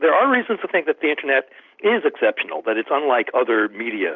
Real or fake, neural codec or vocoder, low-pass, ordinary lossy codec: real; none; 7.2 kHz; Opus, 64 kbps